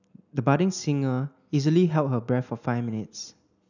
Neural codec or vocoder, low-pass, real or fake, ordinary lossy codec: none; 7.2 kHz; real; none